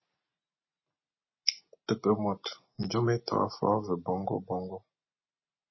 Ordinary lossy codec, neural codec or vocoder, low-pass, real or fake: MP3, 24 kbps; none; 7.2 kHz; real